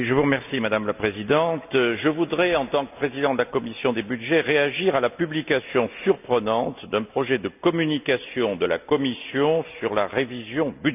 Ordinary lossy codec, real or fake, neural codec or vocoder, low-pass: none; real; none; 3.6 kHz